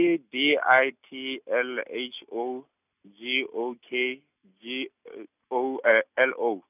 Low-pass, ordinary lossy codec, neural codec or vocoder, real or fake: 3.6 kHz; none; none; real